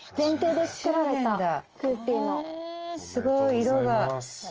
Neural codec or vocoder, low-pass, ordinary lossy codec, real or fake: none; 7.2 kHz; Opus, 24 kbps; real